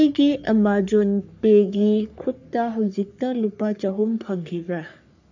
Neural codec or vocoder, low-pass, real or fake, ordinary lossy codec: codec, 44.1 kHz, 3.4 kbps, Pupu-Codec; 7.2 kHz; fake; none